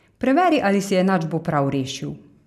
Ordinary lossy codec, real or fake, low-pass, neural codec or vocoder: none; real; 14.4 kHz; none